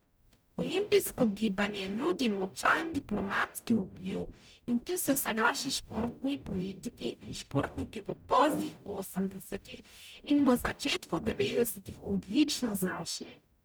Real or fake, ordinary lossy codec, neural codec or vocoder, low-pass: fake; none; codec, 44.1 kHz, 0.9 kbps, DAC; none